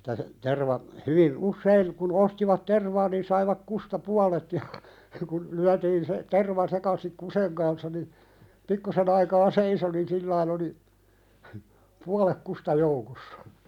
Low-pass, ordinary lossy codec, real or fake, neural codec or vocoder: 19.8 kHz; none; real; none